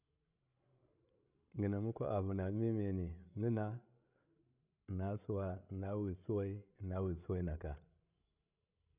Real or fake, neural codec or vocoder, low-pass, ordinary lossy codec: fake; codec, 16 kHz, 16 kbps, FreqCodec, larger model; 3.6 kHz; none